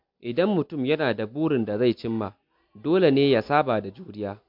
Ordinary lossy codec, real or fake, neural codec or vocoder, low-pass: MP3, 48 kbps; real; none; 5.4 kHz